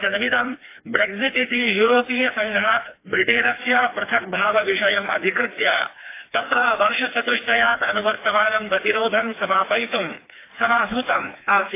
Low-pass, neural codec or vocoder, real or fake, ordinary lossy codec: 3.6 kHz; codec, 16 kHz, 2 kbps, FreqCodec, smaller model; fake; AAC, 24 kbps